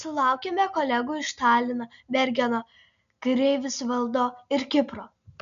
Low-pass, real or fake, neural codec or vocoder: 7.2 kHz; real; none